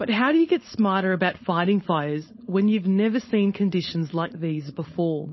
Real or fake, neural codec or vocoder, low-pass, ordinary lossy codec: fake; codec, 16 kHz, 4.8 kbps, FACodec; 7.2 kHz; MP3, 24 kbps